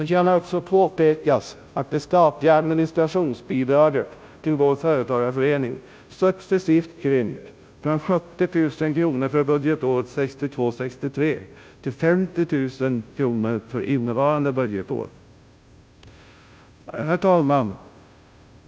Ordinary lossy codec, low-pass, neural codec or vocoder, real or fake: none; none; codec, 16 kHz, 0.5 kbps, FunCodec, trained on Chinese and English, 25 frames a second; fake